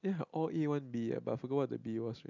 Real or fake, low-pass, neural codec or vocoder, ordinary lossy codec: real; 7.2 kHz; none; none